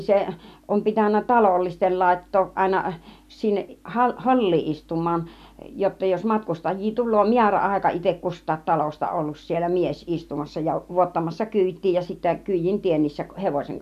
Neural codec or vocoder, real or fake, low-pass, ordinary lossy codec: none; real; 14.4 kHz; none